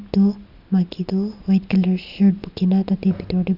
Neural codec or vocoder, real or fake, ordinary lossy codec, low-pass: none; real; Opus, 64 kbps; 5.4 kHz